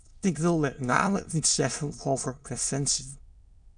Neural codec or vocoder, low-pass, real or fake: autoencoder, 22.05 kHz, a latent of 192 numbers a frame, VITS, trained on many speakers; 9.9 kHz; fake